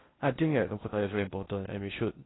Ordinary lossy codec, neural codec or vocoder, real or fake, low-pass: AAC, 16 kbps; codec, 16 kHz in and 24 kHz out, 0.6 kbps, FocalCodec, streaming, 4096 codes; fake; 7.2 kHz